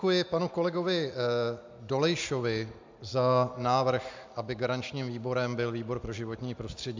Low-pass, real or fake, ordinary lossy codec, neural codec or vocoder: 7.2 kHz; real; MP3, 64 kbps; none